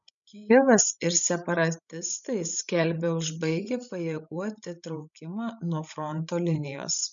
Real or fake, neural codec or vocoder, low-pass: fake; codec, 16 kHz, 16 kbps, FreqCodec, larger model; 7.2 kHz